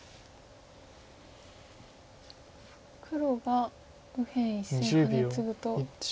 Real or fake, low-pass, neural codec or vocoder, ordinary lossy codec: real; none; none; none